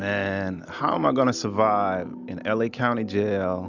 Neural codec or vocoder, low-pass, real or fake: none; 7.2 kHz; real